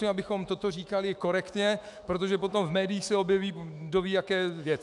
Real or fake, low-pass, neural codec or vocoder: fake; 10.8 kHz; autoencoder, 48 kHz, 128 numbers a frame, DAC-VAE, trained on Japanese speech